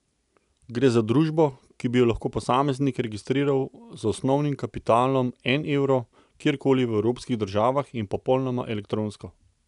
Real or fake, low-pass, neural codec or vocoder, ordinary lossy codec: real; 10.8 kHz; none; none